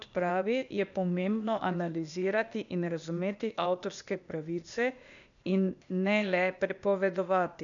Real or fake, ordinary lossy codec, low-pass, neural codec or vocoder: fake; none; 7.2 kHz; codec, 16 kHz, 0.8 kbps, ZipCodec